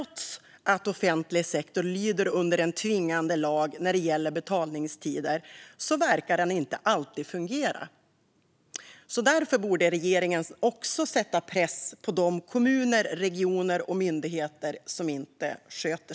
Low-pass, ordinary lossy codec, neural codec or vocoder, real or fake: none; none; none; real